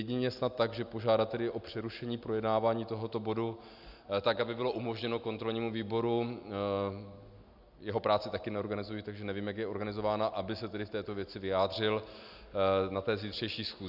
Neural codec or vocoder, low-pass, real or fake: none; 5.4 kHz; real